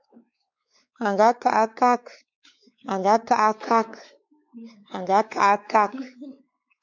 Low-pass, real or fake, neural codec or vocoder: 7.2 kHz; fake; codec, 16 kHz, 4 kbps, X-Codec, WavLM features, trained on Multilingual LibriSpeech